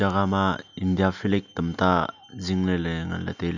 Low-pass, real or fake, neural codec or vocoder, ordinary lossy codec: 7.2 kHz; real; none; none